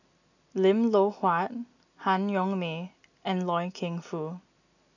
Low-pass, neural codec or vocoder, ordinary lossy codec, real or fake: 7.2 kHz; none; none; real